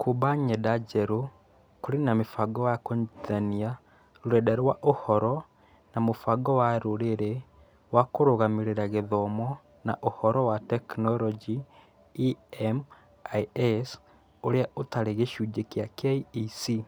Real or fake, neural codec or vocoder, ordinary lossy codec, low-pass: real; none; none; none